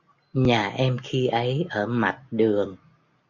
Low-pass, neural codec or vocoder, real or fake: 7.2 kHz; none; real